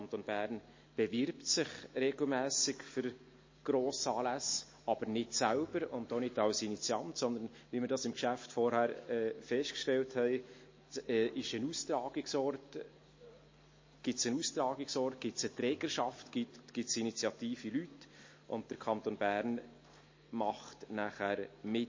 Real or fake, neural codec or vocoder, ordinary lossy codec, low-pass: real; none; MP3, 32 kbps; 7.2 kHz